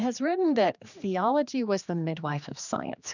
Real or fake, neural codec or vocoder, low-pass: fake; codec, 16 kHz, 2 kbps, X-Codec, HuBERT features, trained on general audio; 7.2 kHz